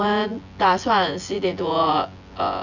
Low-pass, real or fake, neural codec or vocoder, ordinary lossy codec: 7.2 kHz; fake; vocoder, 24 kHz, 100 mel bands, Vocos; none